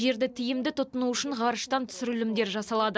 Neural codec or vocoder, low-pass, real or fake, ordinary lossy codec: none; none; real; none